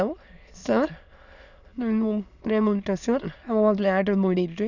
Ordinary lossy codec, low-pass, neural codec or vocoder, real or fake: none; 7.2 kHz; autoencoder, 22.05 kHz, a latent of 192 numbers a frame, VITS, trained on many speakers; fake